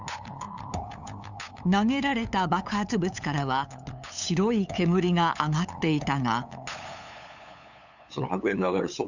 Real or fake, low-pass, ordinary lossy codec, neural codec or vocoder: fake; 7.2 kHz; none; codec, 16 kHz, 8 kbps, FunCodec, trained on LibriTTS, 25 frames a second